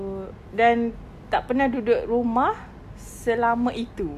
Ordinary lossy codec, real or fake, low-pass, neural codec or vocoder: AAC, 96 kbps; real; 14.4 kHz; none